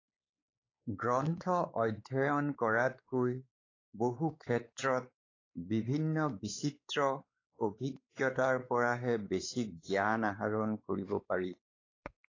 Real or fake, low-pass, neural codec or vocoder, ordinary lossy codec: fake; 7.2 kHz; codec, 16 kHz, 4 kbps, X-Codec, WavLM features, trained on Multilingual LibriSpeech; AAC, 32 kbps